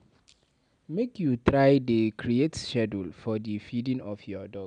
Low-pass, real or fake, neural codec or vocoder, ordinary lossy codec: 9.9 kHz; real; none; none